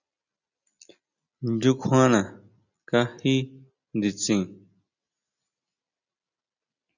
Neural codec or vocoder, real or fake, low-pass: none; real; 7.2 kHz